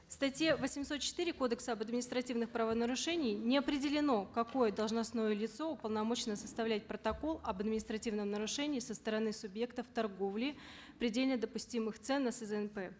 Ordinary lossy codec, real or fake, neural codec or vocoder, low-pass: none; real; none; none